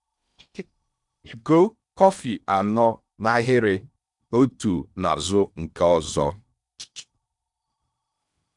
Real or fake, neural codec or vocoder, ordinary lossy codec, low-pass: fake; codec, 16 kHz in and 24 kHz out, 0.8 kbps, FocalCodec, streaming, 65536 codes; none; 10.8 kHz